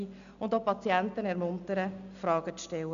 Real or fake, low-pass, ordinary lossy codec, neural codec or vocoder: real; 7.2 kHz; none; none